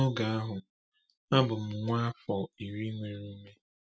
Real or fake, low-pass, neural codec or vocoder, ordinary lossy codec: real; none; none; none